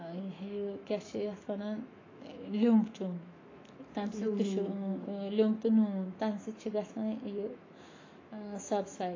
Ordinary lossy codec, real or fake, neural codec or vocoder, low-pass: AAC, 32 kbps; fake; autoencoder, 48 kHz, 128 numbers a frame, DAC-VAE, trained on Japanese speech; 7.2 kHz